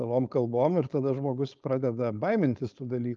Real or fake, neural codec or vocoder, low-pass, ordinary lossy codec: fake; codec, 16 kHz, 4.8 kbps, FACodec; 7.2 kHz; Opus, 24 kbps